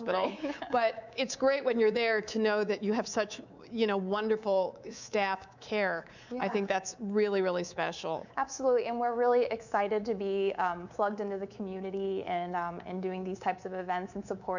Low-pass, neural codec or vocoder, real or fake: 7.2 kHz; codec, 24 kHz, 3.1 kbps, DualCodec; fake